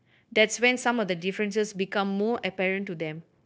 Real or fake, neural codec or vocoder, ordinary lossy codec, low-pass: fake; codec, 16 kHz, 0.9 kbps, LongCat-Audio-Codec; none; none